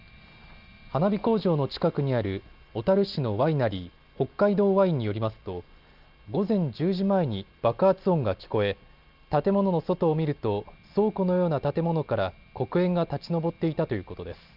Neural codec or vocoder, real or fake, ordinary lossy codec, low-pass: none; real; Opus, 24 kbps; 5.4 kHz